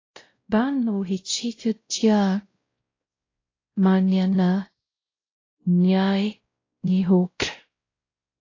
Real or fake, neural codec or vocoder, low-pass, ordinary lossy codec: fake; codec, 16 kHz, 0.5 kbps, X-Codec, WavLM features, trained on Multilingual LibriSpeech; 7.2 kHz; AAC, 32 kbps